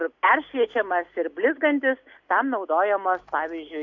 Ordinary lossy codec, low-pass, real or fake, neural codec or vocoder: AAC, 48 kbps; 7.2 kHz; real; none